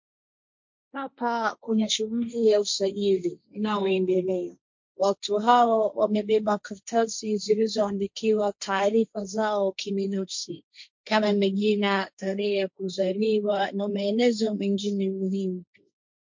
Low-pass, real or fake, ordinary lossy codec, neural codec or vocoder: 7.2 kHz; fake; MP3, 48 kbps; codec, 16 kHz, 1.1 kbps, Voila-Tokenizer